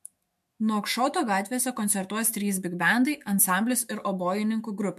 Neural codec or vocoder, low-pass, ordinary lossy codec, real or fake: autoencoder, 48 kHz, 128 numbers a frame, DAC-VAE, trained on Japanese speech; 14.4 kHz; MP3, 64 kbps; fake